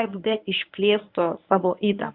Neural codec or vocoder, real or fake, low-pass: codec, 24 kHz, 0.9 kbps, WavTokenizer, medium speech release version 1; fake; 5.4 kHz